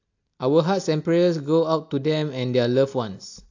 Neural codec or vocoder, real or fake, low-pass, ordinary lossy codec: none; real; 7.2 kHz; AAC, 48 kbps